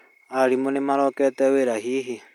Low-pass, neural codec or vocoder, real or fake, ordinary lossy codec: 19.8 kHz; none; real; MP3, 96 kbps